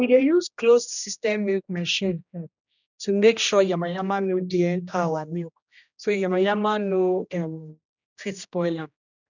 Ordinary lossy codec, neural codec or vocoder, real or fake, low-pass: none; codec, 16 kHz, 1 kbps, X-Codec, HuBERT features, trained on general audio; fake; 7.2 kHz